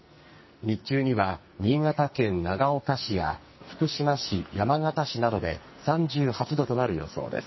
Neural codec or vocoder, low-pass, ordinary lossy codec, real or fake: codec, 44.1 kHz, 2.6 kbps, SNAC; 7.2 kHz; MP3, 24 kbps; fake